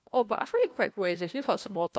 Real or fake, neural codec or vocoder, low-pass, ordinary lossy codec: fake; codec, 16 kHz, 1 kbps, FunCodec, trained on LibriTTS, 50 frames a second; none; none